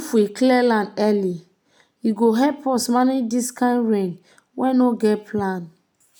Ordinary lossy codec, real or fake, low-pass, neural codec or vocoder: none; real; none; none